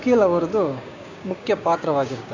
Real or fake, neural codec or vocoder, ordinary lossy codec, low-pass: fake; codec, 16 kHz, 6 kbps, DAC; AAC, 48 kbps; 7.2 kHz